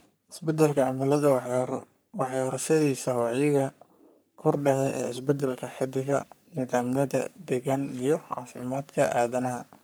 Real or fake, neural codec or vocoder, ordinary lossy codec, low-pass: fake; codec, 44.1 kHz, 3.4 kbps, Pupu-Codec; none; none